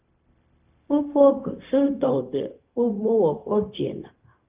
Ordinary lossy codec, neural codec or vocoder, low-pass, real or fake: Opus, 32 kbps; codec, 16 kHz, 0.4 kbps, LongCat-Audio-Codec; 3.6 kHz; fake